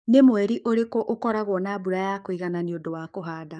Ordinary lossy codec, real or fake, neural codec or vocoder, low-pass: none; fake; codec, 44.1 kHz, 7.8 kbps, DAC; 9.9 kHz